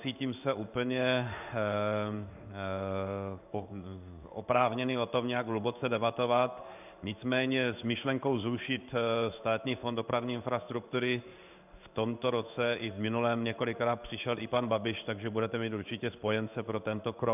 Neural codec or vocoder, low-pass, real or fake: codec, 16 kHz in and 24 kHz out, 1 kbps, XY-Tokenizer; 3.6 kHz; fake